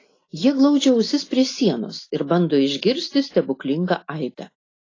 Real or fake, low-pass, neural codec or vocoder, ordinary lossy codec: real; 7.2 kHz; none; AAC, 32 kbps